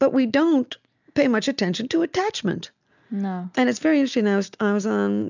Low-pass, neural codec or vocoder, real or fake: 7.2 kHz; none; real